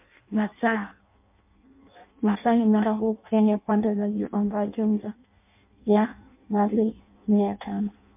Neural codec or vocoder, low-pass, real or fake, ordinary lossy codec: codec, 16 kHz in and 24 kHz out, 0.6 kbps, FireRedTTS-2 codec; 3.6 kHz; fake; MP3, 32 kbps